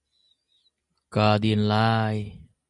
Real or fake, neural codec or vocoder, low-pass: real; none; 10.8 kHz